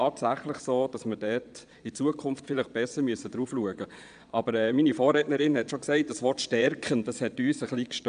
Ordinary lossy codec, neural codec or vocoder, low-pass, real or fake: AAC, 96 kbps; vocoder, 22.05 kHz, 80 mel bands, Vocos; 9.9 kHz; fake